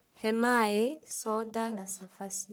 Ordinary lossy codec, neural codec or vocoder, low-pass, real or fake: none; codec, 44.1 kHz, 1.7 kbps, Pupu-Codec; none; fake